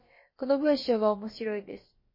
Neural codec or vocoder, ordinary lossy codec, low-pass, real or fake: codec, 16 kHz, about 1 kbps, DyCAST, with the encoder's durations; MP3, 24 kbps; 5.4 kHz; fake